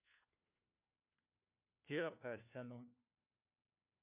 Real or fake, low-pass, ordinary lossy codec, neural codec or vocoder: fake; 3.6 kHz; MP3, 32 kbps; codec, 16 kHz, 1 kbps, FunCodec, trained on LibriTTS, 50 frames a second